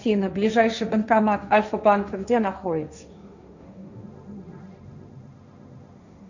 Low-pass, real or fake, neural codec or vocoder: 7.2 kHz; fake; codec, 16 kHz, 1.1 kbps, Voila-Tokenizer